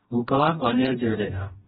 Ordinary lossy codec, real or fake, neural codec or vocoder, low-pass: AAC, 16 kbps; fake; codec, 16 kHz, 1 kbps, FreqCodec, smaller model; 7.2 kHz